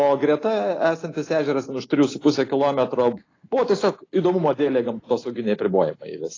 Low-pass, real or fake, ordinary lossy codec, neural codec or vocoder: 7.2 kHz; real; AAC, 32 kbps; none